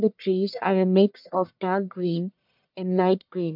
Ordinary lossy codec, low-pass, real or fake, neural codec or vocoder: MP3, 48 kbps; 5.4 kHz; fake; codec, 44.1 kHz, 1.7 kbps, Pupu-Codec